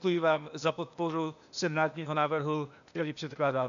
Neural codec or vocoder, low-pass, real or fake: codec, 16 kHz, 0.8 kbps, ZipCodec; 7.2 kHz; fake